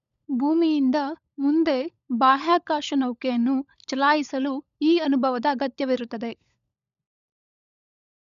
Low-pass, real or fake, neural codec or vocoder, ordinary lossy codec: 7.2 kHz; fake; codec, 16 kHz, 16 kbps, FunCodec, trained on LibriTTS, 50 frames a second; none